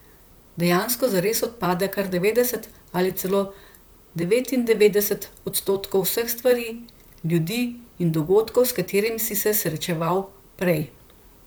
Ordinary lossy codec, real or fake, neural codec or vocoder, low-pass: none; fake; vocoder, 44.1 kHz, 128 mel bands, Pupu-Vocoder; none